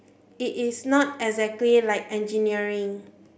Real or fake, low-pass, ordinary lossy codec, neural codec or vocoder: real; none; none; none